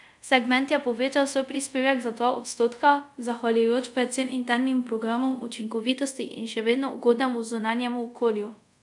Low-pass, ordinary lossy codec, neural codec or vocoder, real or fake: 10.8 kHz; none; codec, 24 kHz, 0.5 kbps, DualCodec; fake